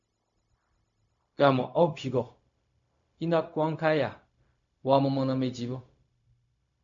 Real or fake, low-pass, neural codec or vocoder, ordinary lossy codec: fake; 7.2 kHz; codec, 16 kHz, 0.4 kbps, LongCat-Audio-Codec; MP3, 48 kbps